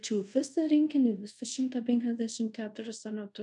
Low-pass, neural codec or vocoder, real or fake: 10.8 kHz; codec, 24 kHz, 0.5 kbps, DualCodec; fake